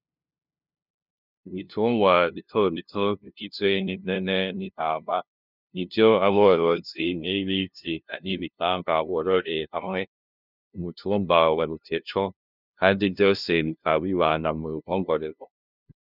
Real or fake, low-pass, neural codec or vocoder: fake; 5.4 kHz; codec, 16 kHz, 0.5 kbps, FunCodec, trained on LibriTTS, 25 frames a second